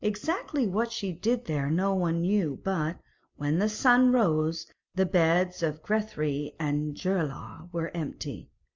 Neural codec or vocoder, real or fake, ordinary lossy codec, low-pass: none; real; MP3, 64 kbps; 7.2 kHz